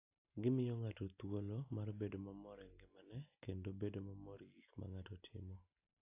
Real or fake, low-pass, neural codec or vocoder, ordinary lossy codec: real; 3.6 kHz; none; AAC, 24 kbps